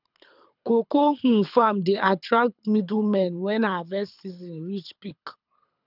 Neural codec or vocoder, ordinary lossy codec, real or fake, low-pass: codec, 24 kHz, 6 kbps, HILCodec; none; fake; 5.4 kHz